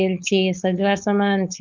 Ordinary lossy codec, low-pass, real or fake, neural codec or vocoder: Opus, 24 kbps; 7.2 kHz; fake; codec, 16 kHz, 4.8 kbps, FACodec